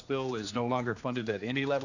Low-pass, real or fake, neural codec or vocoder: 7.2 kHz; fake; codec, 16 kHz, 2 kbps, X-Codec, HuBERT features, trained on general audio